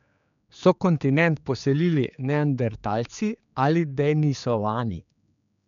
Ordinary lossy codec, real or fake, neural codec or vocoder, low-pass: none; fake; codec, 16 kHz, 4 kbps, X-Codec, HuBERT features, trained on general audio; 7.2 kHz